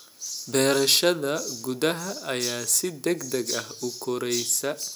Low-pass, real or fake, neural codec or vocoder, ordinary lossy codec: none; real; none; none